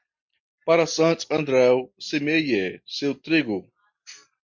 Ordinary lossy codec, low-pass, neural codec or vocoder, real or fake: MP3, 48 kbps; 7.2 kHz; none; real